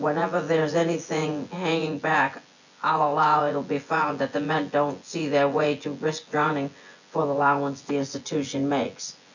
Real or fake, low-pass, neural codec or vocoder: fake; 7.2 kHz; vocoder, 24 kHz, 100 mel bands, Vocos